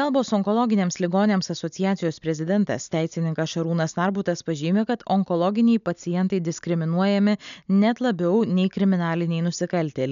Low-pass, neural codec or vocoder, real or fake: 7.2 kHz; none; real